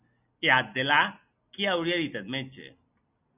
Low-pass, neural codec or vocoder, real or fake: 3.6 kHz; none; real